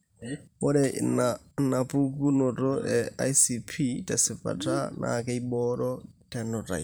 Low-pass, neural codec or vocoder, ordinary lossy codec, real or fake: none; vocoder, 44.1 kHz, 128 mel bands every 512 samples, BigVGAN v2; none; fake